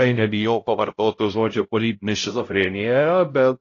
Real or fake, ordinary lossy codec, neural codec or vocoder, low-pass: fake; AAC, 32 kbps; codec, 16 kHz, 0.5 kbps, X-Codec, HuBERT features, trained on LibriSpeech; 7.2 kHz